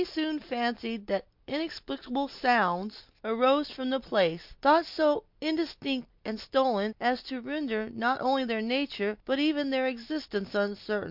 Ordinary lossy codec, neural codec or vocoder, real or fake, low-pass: MP3, 48 kbps; none; real; 5.4 kHz